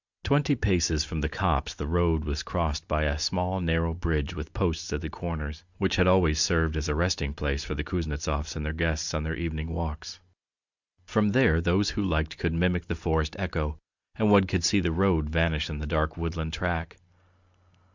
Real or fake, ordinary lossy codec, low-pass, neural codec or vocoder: real; Opus, 64 kbps; 7.2 kHz; none